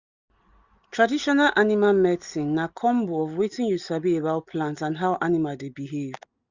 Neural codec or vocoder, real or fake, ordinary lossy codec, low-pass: none; real; Opus, 64 kbps; 7.2 kHz